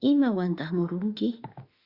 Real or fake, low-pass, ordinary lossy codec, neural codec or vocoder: fake; 5.4 kHz; Opus, 64 kbps; autoencoder, 48 kHz, 32 numbers a frame, DAC-VAE, trained on Japanese speech